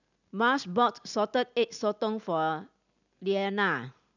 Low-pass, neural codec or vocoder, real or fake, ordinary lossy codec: 7.2 kHz; none; real; none